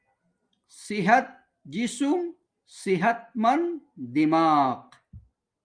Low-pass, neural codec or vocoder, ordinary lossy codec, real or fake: 9.9 kHz; none; Opus, 32 kbps; real